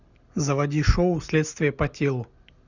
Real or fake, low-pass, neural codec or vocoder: real; 7.2 kHz; none